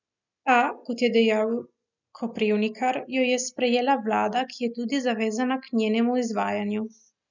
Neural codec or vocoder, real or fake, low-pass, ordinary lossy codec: none; real; 7.2 kHz; none